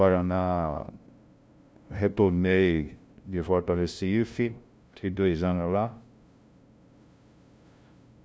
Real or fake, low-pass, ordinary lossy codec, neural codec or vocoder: fake; none; none; codec, 16 kHz, 0.5 kbps, FunCodec, trained on LibriTTS, 25 frames a second